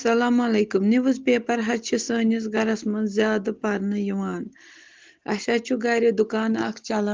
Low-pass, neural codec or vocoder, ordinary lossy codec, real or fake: 7.2 kHz; none; Opus, 16 kbps; real